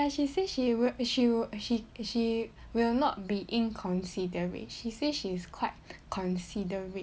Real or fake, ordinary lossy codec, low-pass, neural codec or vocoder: real; none; none; none